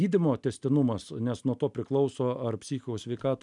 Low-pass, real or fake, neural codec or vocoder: 10.8 kHz; real; none